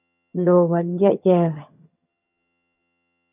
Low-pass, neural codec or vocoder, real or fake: 3.6 kHz; vocoder, 22.05 kHz, 80 mel bands, HiFi-GAN; fake